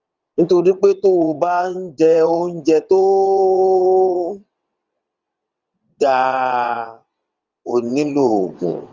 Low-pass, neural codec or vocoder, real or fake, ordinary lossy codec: 7.2 kHz; vocoder, 22.05 kHz, 80 mel bands, WaveNeXt; fake; Opus, 32 kbps